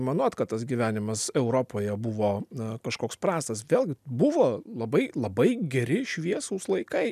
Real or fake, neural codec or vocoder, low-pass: real; none; 14.4 kHz